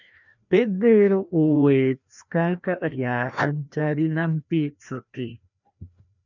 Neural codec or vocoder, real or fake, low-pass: codec, 16 kHz, 1 kbps, FreqCodec, larger model; fake; 7.2 kHz